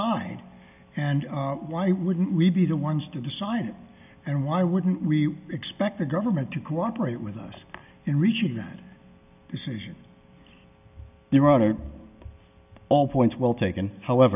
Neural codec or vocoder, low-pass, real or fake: none; 3.6 kHz; real